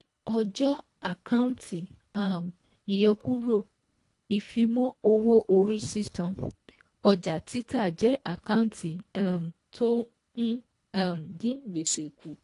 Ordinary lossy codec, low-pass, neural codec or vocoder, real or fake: AAC, 48 kbps; 10.8 kHz; codec, 24 kHz, 1.5 kbps, HILCodec; fake